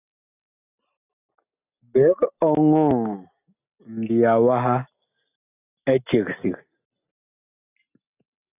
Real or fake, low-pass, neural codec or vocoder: real; 3.6 kHz; none